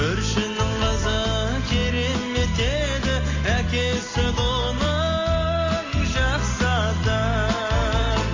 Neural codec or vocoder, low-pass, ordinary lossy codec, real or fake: none; 7.2 kHz; AAC, 32 kbps; real